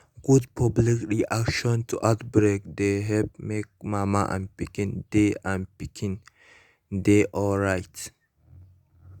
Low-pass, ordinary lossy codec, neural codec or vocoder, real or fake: 19.8 kHz; Opus, 64 kbps; none; real